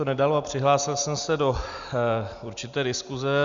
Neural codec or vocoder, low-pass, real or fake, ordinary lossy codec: none; 7.2 kHz; real; Opus, 64 kbps